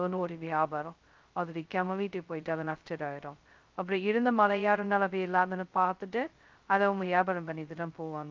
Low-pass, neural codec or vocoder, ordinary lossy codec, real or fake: 7.2 kHz; codec, 16 kHz, 0.2 kbps, FocalCodec; Opus, 24 kbps; fake